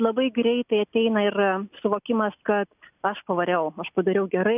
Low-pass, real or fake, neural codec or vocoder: 3.6 kHz; real; none